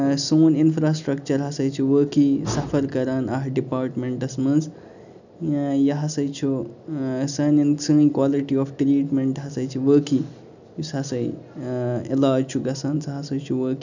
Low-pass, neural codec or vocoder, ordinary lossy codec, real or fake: 7.2 kHz; none; none; real